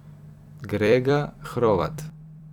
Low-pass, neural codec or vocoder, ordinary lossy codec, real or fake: 19.8 kHz; vocoder, 44.1 kHz, 128 mel bands every 256 samples, BigVGAN v2; none; fake